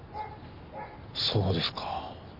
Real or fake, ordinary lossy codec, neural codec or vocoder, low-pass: real; none; none; 5.4 kHz